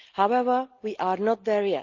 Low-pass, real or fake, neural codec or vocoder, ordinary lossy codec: 7.2 kHz; real; none; Opus, 32 kbps